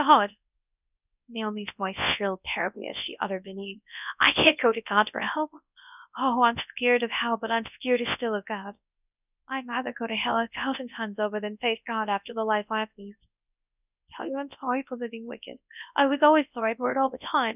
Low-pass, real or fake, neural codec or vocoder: 3.6 kHz; fake; codec, 24 kHz, 0.9 kbps, WavTokenizer, large speech release